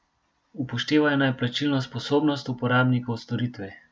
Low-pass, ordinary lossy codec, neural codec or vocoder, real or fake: none; none; none; real